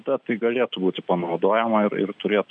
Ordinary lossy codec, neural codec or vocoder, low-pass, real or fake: MP3, 96 kbps; none; 9.9 kHz; real